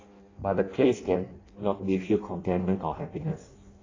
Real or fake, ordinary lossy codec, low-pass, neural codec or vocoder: fake; AAC, 32 kbps; 7.2 kHz; codec, 16 kHz in and 24 kHz out, 0.6 kbps, FireRedTTS-2 codec